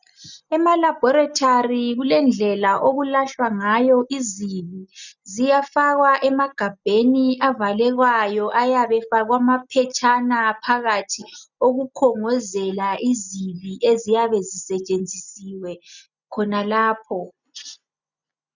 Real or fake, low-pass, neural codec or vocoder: real; 7.2 kHz; none